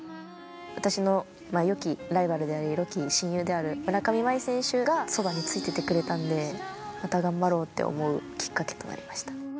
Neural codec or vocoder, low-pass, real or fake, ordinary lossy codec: none; none; real; none